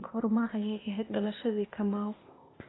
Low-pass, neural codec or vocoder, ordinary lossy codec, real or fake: 7.2 kHz; codec, 16 kHz, 0.8 kbps, ZipCodec; AAC, 16 kbps; fake